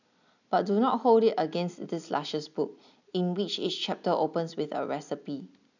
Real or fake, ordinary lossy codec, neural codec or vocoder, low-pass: real; none; none; 7.2 kHz